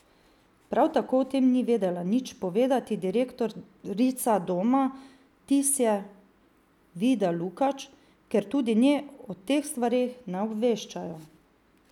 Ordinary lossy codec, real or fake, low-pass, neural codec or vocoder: none; real; 19.8 kHz; none